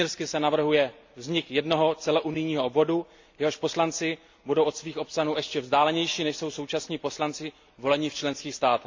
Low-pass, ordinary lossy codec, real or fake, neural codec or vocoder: 7.2 kHz; MP3, 64 kbps; real; none